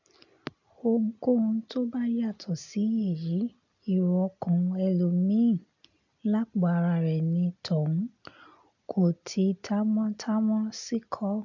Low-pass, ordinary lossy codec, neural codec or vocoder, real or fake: 7.2 kHz; none; none; real